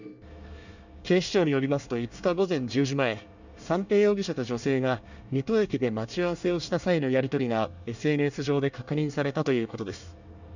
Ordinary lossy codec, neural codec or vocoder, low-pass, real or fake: none; codec, 24 kHz, 1 kbps, SNAC; 7.2 kHz; fake